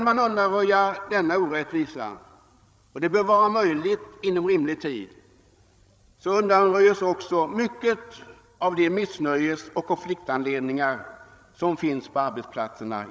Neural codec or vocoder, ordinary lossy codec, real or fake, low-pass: codec, 16 kHz, 16 kbps, FreqCodec, larger model; none; fake; none